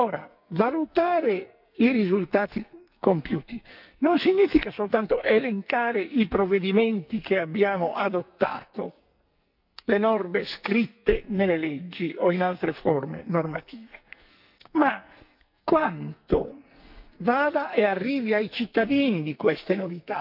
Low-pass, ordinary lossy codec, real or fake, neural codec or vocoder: 5.4 kHz; none; fake; codec, 44.1 kHz, 2.6 kbps, SNAC